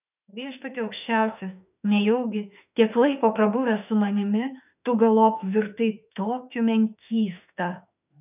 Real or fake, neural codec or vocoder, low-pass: fake; autoencoder, 48 kHz, 32 numbers a frame, DAC-VAE, trained on Japanese speech; 3.6 kHz